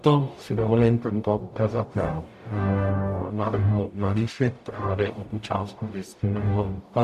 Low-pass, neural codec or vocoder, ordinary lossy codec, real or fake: 14.4 kHz; codec, 44.1 kHz, 0.9 kbps, DAC; MP3, 96 kbps; fake